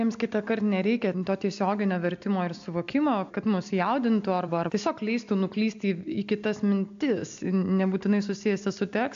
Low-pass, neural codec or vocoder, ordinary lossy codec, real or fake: 7.2 kHz; none; AAC, 64 kbps; real